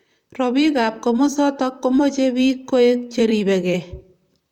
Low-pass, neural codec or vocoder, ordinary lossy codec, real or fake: 19.8 kHz; vocoder, 44.1 kHz, 128 mel bands, Pupu-Vocoder; none; fake